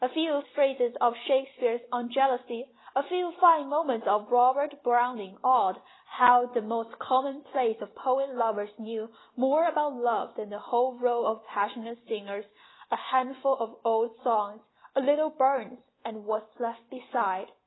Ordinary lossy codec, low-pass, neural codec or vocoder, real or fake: AAC, 16 kbps; 7.2 kHz; none; real